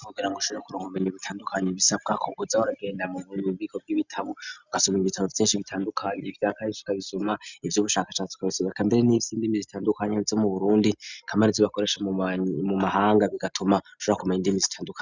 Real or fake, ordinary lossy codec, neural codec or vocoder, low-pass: real; Opus, 64 kbps; none; 7.2 kHz